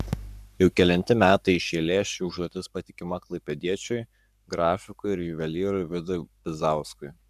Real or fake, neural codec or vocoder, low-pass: fake; codec, 44.1 kHz, 7.8 kbps, Pupu-Codec; 14.4 kHz